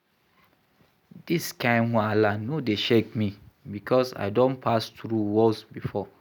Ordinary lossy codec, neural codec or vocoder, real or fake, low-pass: none; none; real; 19.8 kHz